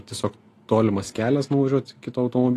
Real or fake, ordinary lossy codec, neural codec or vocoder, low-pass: real; AAC, 48 kbps; none; 14.4 kHz